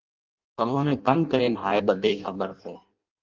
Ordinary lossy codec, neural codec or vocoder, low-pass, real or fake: Opus, 32 kbps; codec, 16 kHz in and 24 kHz out, 0.6 kbps, FireRedTTS-2 codec; 7.2 kHz; fake